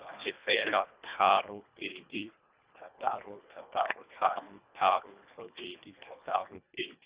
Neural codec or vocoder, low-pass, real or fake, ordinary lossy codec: codec, 24 kHz, 1.5 kbps, HILCodec; 3.6 kHz; fake; Opus, 64 kbps